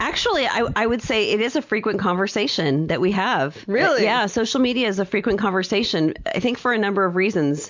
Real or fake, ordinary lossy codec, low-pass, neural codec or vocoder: real; MP3, 64 kbps; 7.2 kHz; none